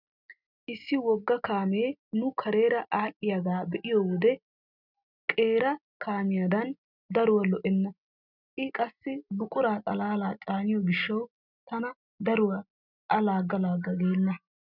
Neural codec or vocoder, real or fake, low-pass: none; real; 5.4 kHz